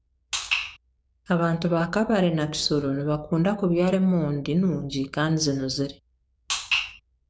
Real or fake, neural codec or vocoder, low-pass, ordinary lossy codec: fake; codec, 16 kHz, 6 kbps, DAC; none; none